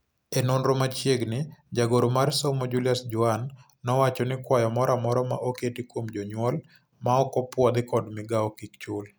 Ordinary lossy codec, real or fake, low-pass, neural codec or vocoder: none; real; none; none